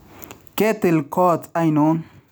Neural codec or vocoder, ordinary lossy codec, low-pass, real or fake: none; none; none; real